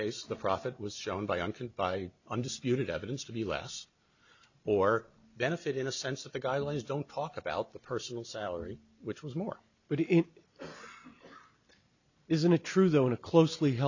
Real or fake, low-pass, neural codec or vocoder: real; 7.2 kHz; none